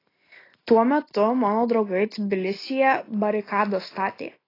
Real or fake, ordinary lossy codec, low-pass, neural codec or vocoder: real; AAC, 24 kbps; 5.4 kHz; none